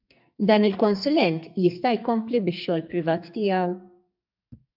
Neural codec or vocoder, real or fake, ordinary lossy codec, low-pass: codec, 44.1 kHz, 2.6 kbps, SNAC; fake; AAC, 48 kbps; 5.4 kHz